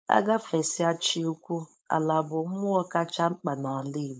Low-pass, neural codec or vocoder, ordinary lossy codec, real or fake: none; codec, 16 kHz, 4.8 kbps, FACodec; none; fake